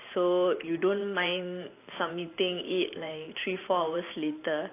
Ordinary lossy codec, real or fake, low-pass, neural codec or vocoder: AAC, 24 kbps; fake; 3.6 kHz; vocoder, 44.1 kHz, 128 mel bands every 512 samples, BigVGAN v2